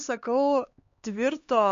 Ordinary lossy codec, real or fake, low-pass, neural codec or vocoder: MP3, 48 kbps; fake; 7.2 kHz; codec, 16 kHz, 2 kbps, X-Codec, HuBERT features, trained on LibriSpeech